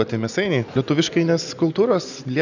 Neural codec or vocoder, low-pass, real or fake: none; 7.2 kHz; real